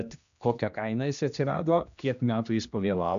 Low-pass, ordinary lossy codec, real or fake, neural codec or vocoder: 7.2 kHz; AAC, 96 kbps; fake; codec, 16 kHz, 1 kbps, X-Codec, HuBERT features, trained on general audio